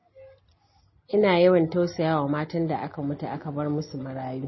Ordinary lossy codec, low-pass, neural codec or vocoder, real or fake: MP3, 24 kbps; 7.2 kHz; none; real